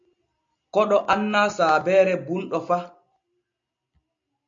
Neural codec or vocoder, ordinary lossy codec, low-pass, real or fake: none; AAC, 64 kbps; 7.2 kHz; real